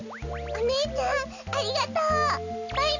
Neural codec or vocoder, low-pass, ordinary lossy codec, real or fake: none; 7.2 kHz; none; real